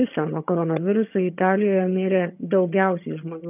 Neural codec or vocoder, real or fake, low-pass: vocoder, 22.05 kHz, 80 mel bands, HiFi-GAN; fake; 3.6 kHz